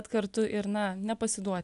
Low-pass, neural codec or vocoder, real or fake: 10.8 kHz; none; real